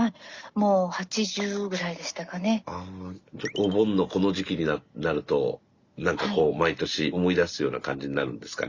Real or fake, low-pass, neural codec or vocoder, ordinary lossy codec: real; 7.2 kHz; none; Opus, 64 kbps